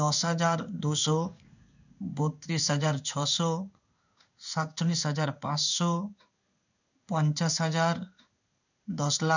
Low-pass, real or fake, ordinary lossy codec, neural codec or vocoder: 7.2 kHz; fake; none; codec, 16 kHz in and 24 kHz out, 1 kbps, XY-Tokenizer